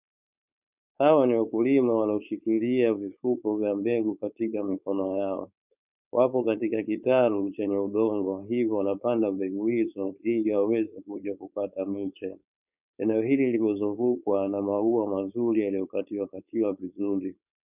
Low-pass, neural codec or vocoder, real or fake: 3.6 kHz; codec, 16 kHz, 4.8 kbps, FACodec; fake